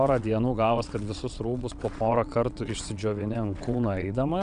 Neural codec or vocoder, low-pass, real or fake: vocoder, 22.05 kHz, 80 mel bands, WaveNeXt; 9.9 kHz; fake